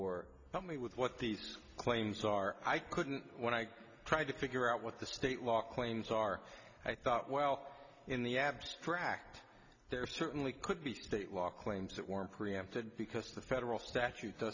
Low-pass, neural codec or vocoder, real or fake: 7.2 kHz; none; real